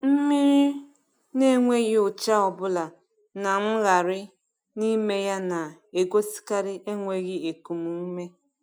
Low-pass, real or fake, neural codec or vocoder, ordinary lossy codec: 19.8 kHz; real; none; none